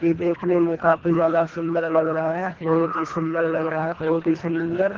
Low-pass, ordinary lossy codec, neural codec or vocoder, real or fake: 7.2 kHz; Opus, 16 kbps; codec, 24 kHz, 1.5 kbps, HILCodec; fake